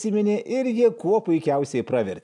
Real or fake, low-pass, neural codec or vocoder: real; 10.8 kHz; none